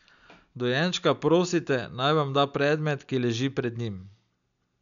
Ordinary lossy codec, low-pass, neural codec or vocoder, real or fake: none; 7.2 kHz; none; real